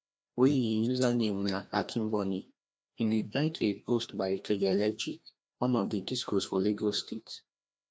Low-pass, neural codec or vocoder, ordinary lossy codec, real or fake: none; codec, 16 kHz, 1 kbps, FreqCodec, larger model; none; fake